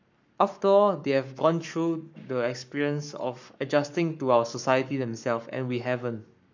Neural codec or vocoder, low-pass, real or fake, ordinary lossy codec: codec, 44.1 kHz, 7.8 kbps, Pupu-Codec; 7.2 kHz; fake; none